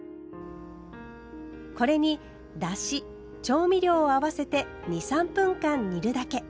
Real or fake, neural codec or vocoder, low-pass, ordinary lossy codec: real; none; none; none